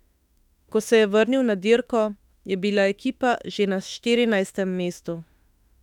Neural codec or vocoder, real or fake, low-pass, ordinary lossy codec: autoencoder, 48 kHz, 32 numbers a frame, DAC-VAE, trained on Japanese speech; fake; 19.8 kHz; none